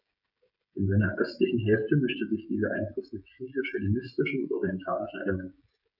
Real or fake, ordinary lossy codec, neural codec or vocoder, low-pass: fake; none; codec, 16 kHz, 16 kbps, FreqCodec, smaller model; 5.4 kHz